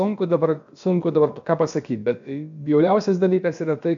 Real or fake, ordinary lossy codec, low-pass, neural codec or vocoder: fake; MP3, 64 kbps; 7.2 kHz; codec, 16 kHz, about 1 kbps, DyCAST, with the encoder's durations